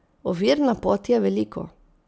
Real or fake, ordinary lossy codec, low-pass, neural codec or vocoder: real; none; none; none